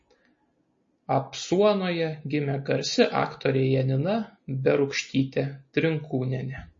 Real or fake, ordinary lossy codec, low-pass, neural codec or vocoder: real; MP3, 32 kbps; 7.2 kHz; none